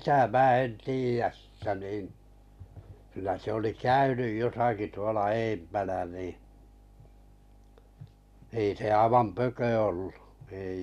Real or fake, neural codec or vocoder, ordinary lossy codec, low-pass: real; none; MP3, 96 kbps; 14.4 kHz